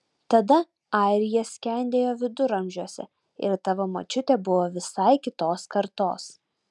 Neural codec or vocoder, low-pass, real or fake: none; 10.8 kHz; real